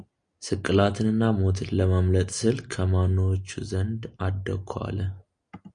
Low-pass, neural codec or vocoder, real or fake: 9.9 kHz; none; real